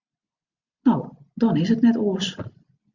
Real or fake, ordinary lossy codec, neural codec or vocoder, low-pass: real; Opus, 64 kbps; none; 7.2 kHz